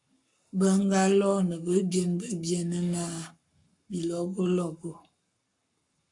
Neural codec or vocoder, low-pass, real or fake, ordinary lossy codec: codec, 44.1 kHz, 7.8 kbps, Pupu-Codec; 10.8 kHz; fake; AAC, 64 kbps